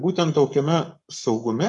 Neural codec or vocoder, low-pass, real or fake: codec, 44.1 kHz, 7.8 kbps, Pupu-Codec; 10.8 kHz; fake